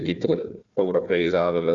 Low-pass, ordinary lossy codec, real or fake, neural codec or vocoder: 7.2 kHz; Opus, 64 kbps; fake; codec, 16 kHz, 1 kbps, FunCodec, trained on Chinese and English, 50 frames a second